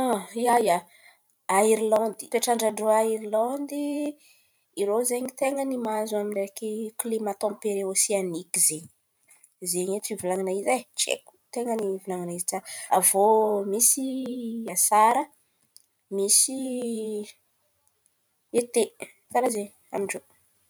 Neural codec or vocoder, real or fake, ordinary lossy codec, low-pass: vocoder, 44.1 kHz, 128 mel bands every 512 samples, BigVGAN v2; fake; none; none